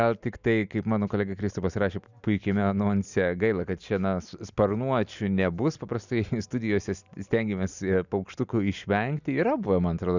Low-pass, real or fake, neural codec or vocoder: 7.2 kHz; real; none